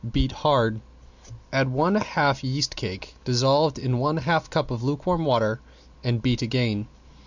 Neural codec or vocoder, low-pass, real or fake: none; 7.2 kHz; real